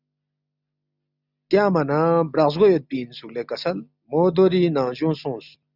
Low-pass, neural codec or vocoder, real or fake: 5.4 kHz; none; real